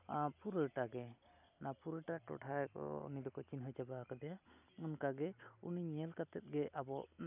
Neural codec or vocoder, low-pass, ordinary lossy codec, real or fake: none; 3.6 kHz; none; real